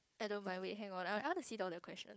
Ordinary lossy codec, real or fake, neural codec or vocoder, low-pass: none; fake; codec, 16 kHz, 4 kbps, FunCodec, trained on Chinese and English, 50 frames a second; none